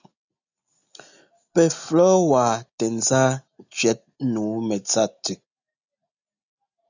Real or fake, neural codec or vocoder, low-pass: real; none; 7.2 kHz